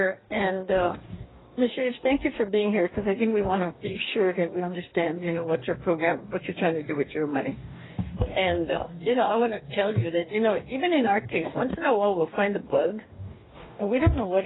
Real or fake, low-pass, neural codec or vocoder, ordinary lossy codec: fake; 7.2 kHz; codec, 44.1 kHz, 2.6 kbps, DAC; AAC, 16 kbps